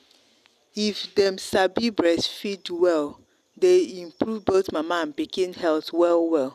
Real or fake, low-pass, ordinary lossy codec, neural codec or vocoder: real; 14.4 kHz; none; none